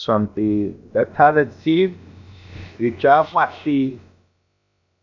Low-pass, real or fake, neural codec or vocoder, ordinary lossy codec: 7.2 kHz; fake; codec, 16 kHz, about 1 kbps, DyCAST, with the encoder's durations; none